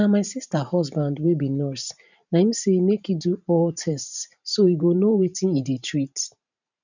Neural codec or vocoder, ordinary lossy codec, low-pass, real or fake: vocoder, 44.1 kHz, 128 mel bands every 512 samples, BigVGAN v2; none; 7.2 kHz; fake